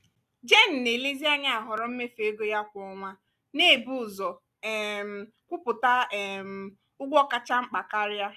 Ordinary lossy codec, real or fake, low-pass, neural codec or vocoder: none; real; 14.4 kHz; none